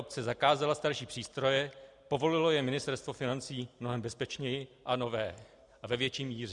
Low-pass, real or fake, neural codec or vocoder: 10.8 kHz; real; none